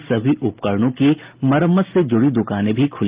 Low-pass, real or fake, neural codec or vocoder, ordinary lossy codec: 3.6 kHz; real; none; Opus, 32 kbps